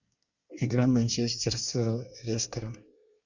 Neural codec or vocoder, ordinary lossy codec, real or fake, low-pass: codec, 24 kHz, 1 kbps, SNAC; Opus, 64 kbps; fake; 7.2 kHz